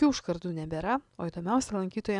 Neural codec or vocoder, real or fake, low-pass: none; real; 10.8 kHz